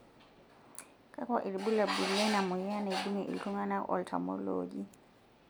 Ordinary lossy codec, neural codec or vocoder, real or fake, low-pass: none; none; real; none